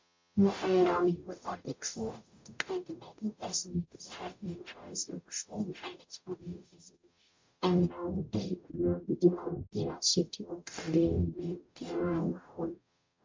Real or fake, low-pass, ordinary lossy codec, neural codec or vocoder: fake; 7.2 kHz; MP3, 64 kbps; codec, 44.1 kHz, 0.9 kbps, DAC